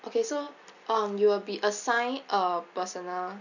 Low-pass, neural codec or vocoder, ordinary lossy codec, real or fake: 7.2 kHz; none; none; real